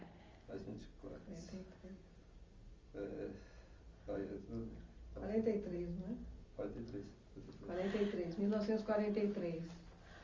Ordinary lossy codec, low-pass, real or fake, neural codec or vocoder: Opus, 32 kbps; 7.2 kHz; real; none